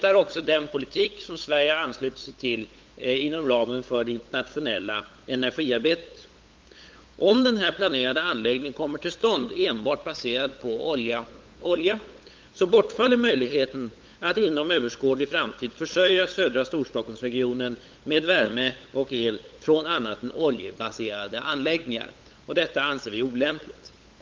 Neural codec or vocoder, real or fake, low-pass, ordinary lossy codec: codec, 16 kHz, 8 kbps, FunCodec, trained on LibriTTS, 25 frames a second; fake; 7.2 kHz; Opus, 32 kbps